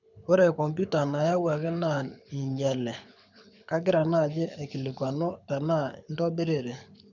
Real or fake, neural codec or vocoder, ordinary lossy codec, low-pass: fake; codec, 24 kHz, 6 kbps, HILCodec; none; 7.2 kHz